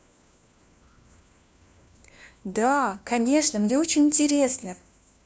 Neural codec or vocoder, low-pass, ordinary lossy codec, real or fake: codec, 16 kHz, 1 kbps, FunCodec, trained on LibriTTS, 50 frames a second; none; none; fake